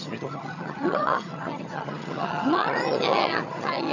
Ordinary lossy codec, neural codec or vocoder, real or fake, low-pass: none; vocoder, 22.05 kHz, 80 mel bands, HiFi-GAN; fake; 7.2 kHz